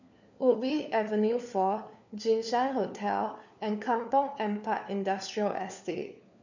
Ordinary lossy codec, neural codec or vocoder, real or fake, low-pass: none; codec, 16 kHz, 4 kbps, FunCodec, trained on LibriTTS, 50 frames a second; fake; 7.2 kHz